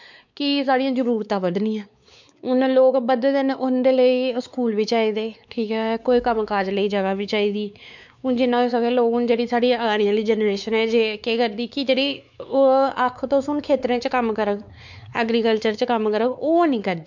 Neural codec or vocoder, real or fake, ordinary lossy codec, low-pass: codec, 16 kHz, 4 kbps, X-Codec, WavLM features, trained on Multilingual LibriSpeech; fake; none; 7.2 kHz